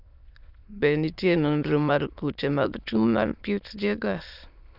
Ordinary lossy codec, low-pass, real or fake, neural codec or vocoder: none; 5.4 kHz; fake; autoencoder, 22.05 kHz, a latent of 192 numbers a frame, VITS, trained on many speakers